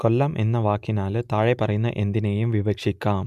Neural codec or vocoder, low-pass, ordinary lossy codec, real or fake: none; 14.4 kHz; MP3, 96 kbps; real